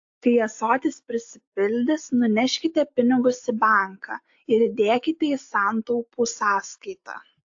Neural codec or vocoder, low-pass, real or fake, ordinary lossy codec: none; 7.2 kHz; real; AAC, 48 kbps